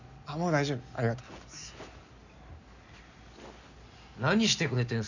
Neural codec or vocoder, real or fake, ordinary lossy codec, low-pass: none; real; none; 7.2 kHz